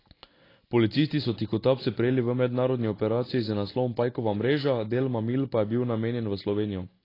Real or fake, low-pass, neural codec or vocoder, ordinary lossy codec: real; 5.4 kHz; none; AAC, 24 kbps